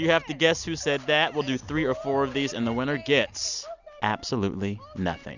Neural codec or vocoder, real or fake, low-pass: none; real; 7.2 kHz